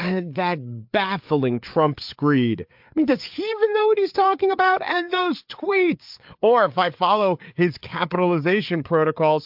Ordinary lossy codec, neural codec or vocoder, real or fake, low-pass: MP3, 48 kbps; codec, 16 kHz, 4 kbps, FreqCodec, larger model; fake; 5.4 kHz